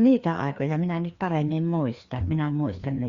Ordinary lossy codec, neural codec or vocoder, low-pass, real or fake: Opus, 64 kbps; codec, 16 kHz, 2 kbps, FreqCodec, larger model; 7.2 kHz; fake